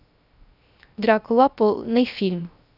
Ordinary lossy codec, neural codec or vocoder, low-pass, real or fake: none; codec, 16 kHz, 0.3 kbps, FocalCodec; 5.4 kHz; fake